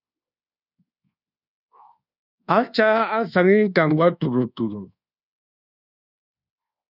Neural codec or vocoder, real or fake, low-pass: codec, 24 kHz, 1.2 kbps, DualCodec; fake; 5.4 kHz